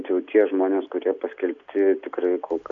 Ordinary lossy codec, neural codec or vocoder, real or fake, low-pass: AAC, 64 kbps; none; real; 7.2 kHz